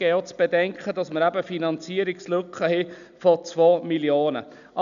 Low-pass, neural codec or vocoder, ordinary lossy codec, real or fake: 7.2 kHz; none; none; real